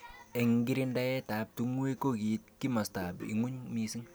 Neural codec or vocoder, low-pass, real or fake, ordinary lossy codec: none; none; real; none